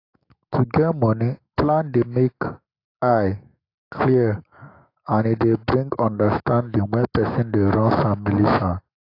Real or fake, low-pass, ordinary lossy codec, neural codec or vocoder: fake; 5.4 kHz; AAC, 32 kbps; autoencoder, 48 kHz, 128 numbers a frame, DAC-VAE, trained on Japanese speech